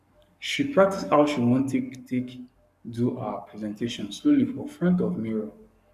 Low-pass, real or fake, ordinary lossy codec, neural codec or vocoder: 14.4 kHz; fake; none; codec, 44.1 kHz, 7.8 kbps, Pupu-Codec